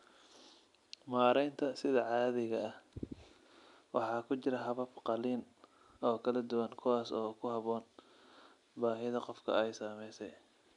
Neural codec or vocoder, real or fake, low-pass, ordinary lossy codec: none; real; 10.8 kHz; none